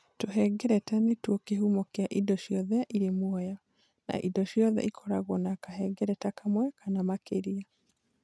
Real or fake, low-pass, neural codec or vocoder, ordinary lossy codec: real; none; none; none